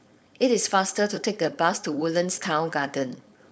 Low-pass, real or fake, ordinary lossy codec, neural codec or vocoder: none; fake; none; codec, 16 kHz, 4.8 kbps, FACodec